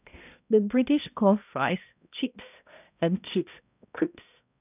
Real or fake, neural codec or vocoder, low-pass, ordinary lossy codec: fake; codec, 16 kHz, 0.5 kbps, X-Codec, HuBERT features, trained on balanced general audio; 3.6 kHz; none